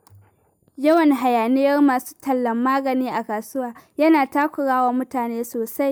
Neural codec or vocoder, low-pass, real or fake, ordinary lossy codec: none; none; real; none